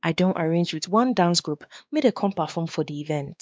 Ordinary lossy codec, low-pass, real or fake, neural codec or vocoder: none; none; fake; codec, 16 kHz, 2 kbps, X-Codec, WavLM features, trained on Multilingual LibriSpeech